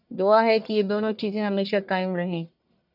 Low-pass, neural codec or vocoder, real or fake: 5.4 kHz; codec, 44.1 kHz, 1.7 kbps, Pupu-Codec; fake